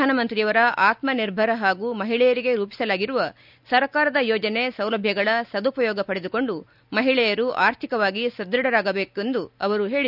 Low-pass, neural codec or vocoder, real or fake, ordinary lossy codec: 5.4 kHz; none; real; none